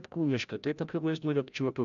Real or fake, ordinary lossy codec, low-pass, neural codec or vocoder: fake; MP3, 96 kbps; 7.2 kHz; codec, 16 kHz, 0.5 kbps, FreqCodec, larger model